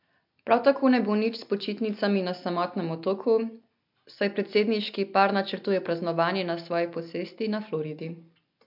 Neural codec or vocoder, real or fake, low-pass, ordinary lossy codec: none; real; 5.4 kHz; MP3, 48 kbps